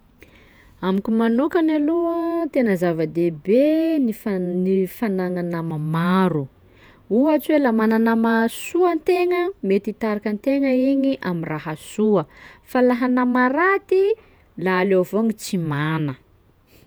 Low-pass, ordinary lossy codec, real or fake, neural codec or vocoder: none; none; fake; vocoder, 48 kHz, 128 mel bands, Vocos